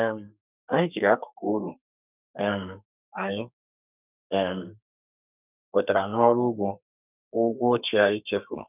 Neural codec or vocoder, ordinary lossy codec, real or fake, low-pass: codec, 32 kHz, 1.9 kbps, SNAC; none; fake; 3.6 kHz